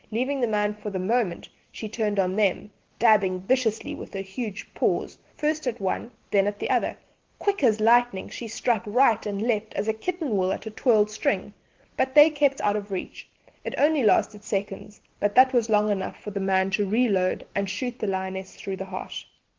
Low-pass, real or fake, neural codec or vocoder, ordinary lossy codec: 7.2 kHz; real; none; Opus, 16 kbps